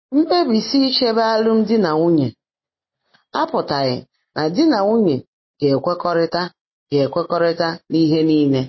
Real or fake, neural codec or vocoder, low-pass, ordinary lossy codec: real; none; 7.2 kHz; MP3, 24 kbps